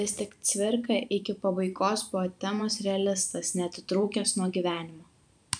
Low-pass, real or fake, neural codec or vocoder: 9.9 kHz; fake; vocoder, 44.1 kHz, 128 mel bands every 512 samples, BigVGAN v2